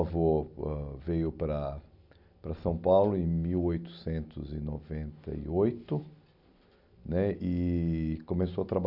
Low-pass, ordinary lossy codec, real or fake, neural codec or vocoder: 5.4 kHz; none; real; none